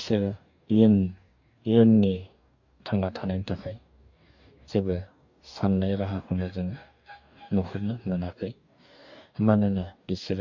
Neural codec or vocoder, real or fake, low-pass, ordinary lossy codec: codec, 44.1 kHz, 2.6 kbps, DAC; fake; 7.2 kHz; none